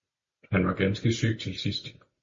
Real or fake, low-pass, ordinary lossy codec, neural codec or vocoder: real; 7.2 kHz; MP3, 32 kbps; none